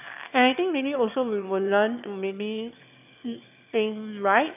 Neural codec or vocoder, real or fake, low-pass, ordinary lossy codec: autoencoder, 22.05 kHz, a latent of 192 numbers a frame, VITS, trained on one speaker; fake; 3.6 kHz; none